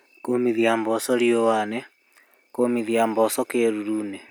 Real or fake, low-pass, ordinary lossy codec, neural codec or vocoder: real; none; none; none